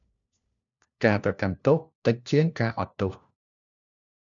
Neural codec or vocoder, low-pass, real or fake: codec, 16 kHz, 1 kbps, FunCodec, trained on LibriTTS, 50 frames a second; 7.2 kHz; fake